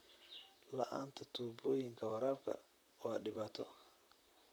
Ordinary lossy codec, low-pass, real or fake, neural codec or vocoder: none; none; fake; vocoder, 44.1 kHz, 128 mel bands, Pupu-Vocoder